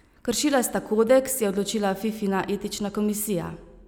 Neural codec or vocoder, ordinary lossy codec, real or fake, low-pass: none; none; real; none